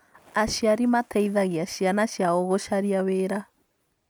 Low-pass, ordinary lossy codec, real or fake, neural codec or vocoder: none; none; real; none